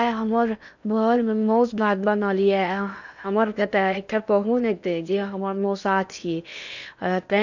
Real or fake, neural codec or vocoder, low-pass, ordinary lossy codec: fake; codec, 16 kHz in and 24 kHz out, 0.8 kbps, FocalCodec, streaming, 65536 codes; 7.2 kHz; none